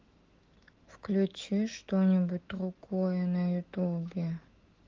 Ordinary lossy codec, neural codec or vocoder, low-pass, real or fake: Opus, 16 kbps; autoencoder, 48 kHz, 128 numbers a frame, DAC-VAE, trained on Japanese speech; 7.2 kHz; fake